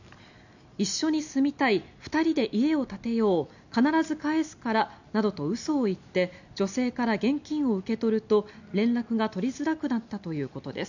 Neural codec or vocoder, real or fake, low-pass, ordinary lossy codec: none; real; 7.2 kHz; none